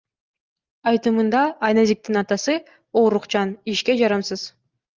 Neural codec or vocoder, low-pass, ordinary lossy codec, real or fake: none; 7.2 kHz; Opus, 32 kbps; real